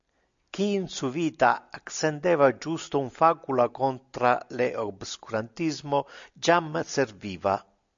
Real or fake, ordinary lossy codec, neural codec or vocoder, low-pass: real; MP3, 64 kbps; none; 7.2 kHz